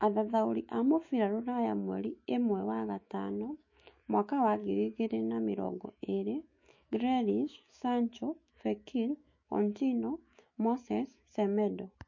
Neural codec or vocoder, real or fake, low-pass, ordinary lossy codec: autoencoder, 48 kHz, 128 numbers a frame, DAC-VAE, trained on Japanese speech; fake; 7.2 kHz; MP3, 32 kbps